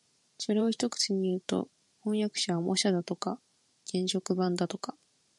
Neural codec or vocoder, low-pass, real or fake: vocoder, 44.1 kHz, 128 mel bands every 512 samples, BigVGAN v2; 10.8 kHz; fake